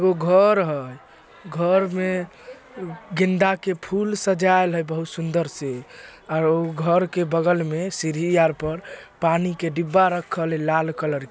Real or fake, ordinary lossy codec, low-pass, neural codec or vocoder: real; none; none; none